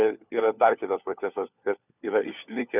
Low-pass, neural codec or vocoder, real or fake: 3.6 kHz; codec, 16 kHz, 4 kbps, FunCodec, trained on LibriTTS, 50 frames a second; fake